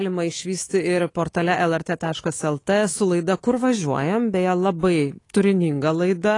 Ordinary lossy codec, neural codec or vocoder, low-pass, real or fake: AAC, 32 kbps; codec, 24 kHz, 3.1 kbps, DualCodec; 10.8 kHz; fake